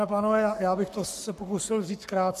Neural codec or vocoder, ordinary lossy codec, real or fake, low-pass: codec, 44.1 kHz, 7.8 kbps, Pupu-Codec; AAC, 96 kbps; fake; 14.4 kHz